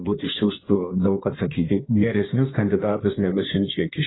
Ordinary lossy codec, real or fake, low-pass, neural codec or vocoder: AAC, 16 kbps; fake; 7.2 kHz; codec, 16 kHz in and 24 kHz out, 1.1 kbps, FireRedTTS-2 codec